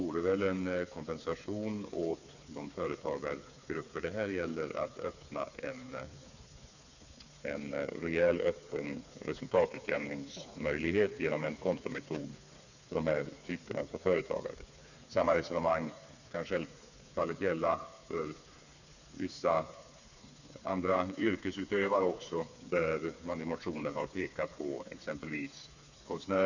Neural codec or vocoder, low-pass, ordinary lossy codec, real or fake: codec, 16 kHz, 4 kbps, FreqCodec, smaller model; 7.2 kHz; none; fake